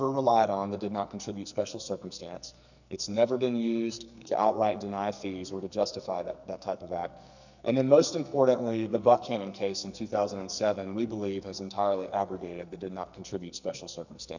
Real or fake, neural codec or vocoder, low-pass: fake; codec, 44.1 kHz, 2.6 kbps, SNAC; 7.2 kHz